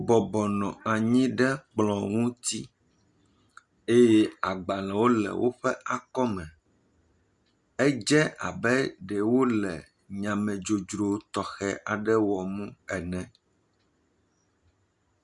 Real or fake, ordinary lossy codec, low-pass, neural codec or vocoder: real; Opus, 64 kbps; 10.8 kHz; none